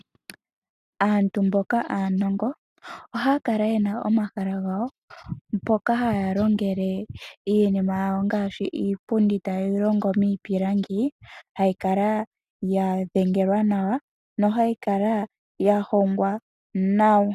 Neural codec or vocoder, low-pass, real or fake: none; 14.4 kHz; real